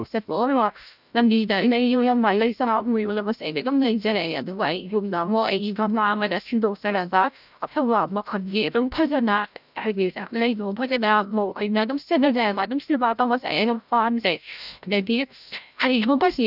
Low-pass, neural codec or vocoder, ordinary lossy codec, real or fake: 5.4 kHz; codec, 16 kHz, 0.5 kbps, FreqCodec, larger model; none; fake